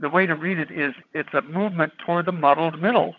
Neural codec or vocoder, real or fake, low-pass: vocoder, 22.05 kHz, 80 mel bands, HiFi-GAN; fake; 7.2 kHz